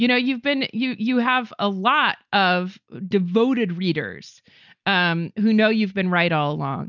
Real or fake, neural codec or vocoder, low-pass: real; none; 7.2 kHz